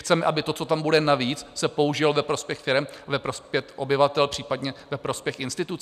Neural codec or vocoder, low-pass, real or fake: none; 14.4 kHz; real